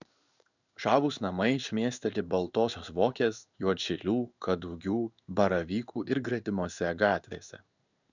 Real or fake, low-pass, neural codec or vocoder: fake; 7.2 kHz; codec, 16 kHz in and 24 kHz out, 1 kbps, XY-Tokenizer